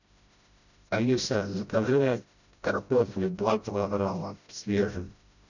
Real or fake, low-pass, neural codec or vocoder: fake; 7.2 kHz; codec, 16 kHz, 0.5 kbps, FreqCodec, smaller model